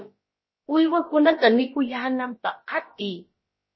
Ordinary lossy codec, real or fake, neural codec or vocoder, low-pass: MP3, 24 kbps; fake; codec, 16 kHz, about 1 kbps, DyCAST, with the encoder's durations; 7.2 kHz